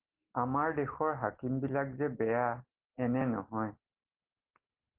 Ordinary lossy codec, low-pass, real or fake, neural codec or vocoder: Opus, 16 kbps; 3.6 kHz; real; none